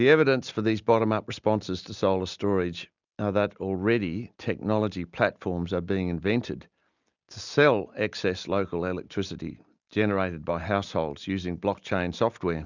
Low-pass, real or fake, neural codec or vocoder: 7.2 kHz; real; none